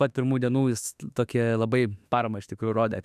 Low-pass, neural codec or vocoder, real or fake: 14.4 kHz; autoencoder, 48 kHz, 32 numbers a frame, DAC-VAE, trained on Japanese speech; fake